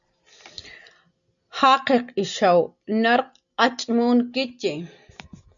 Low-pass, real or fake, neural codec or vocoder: 7.2 kHz; real; none